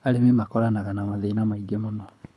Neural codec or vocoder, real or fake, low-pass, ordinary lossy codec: codec, 24 kHz, 6 kbps, HILCodec; fake; none; none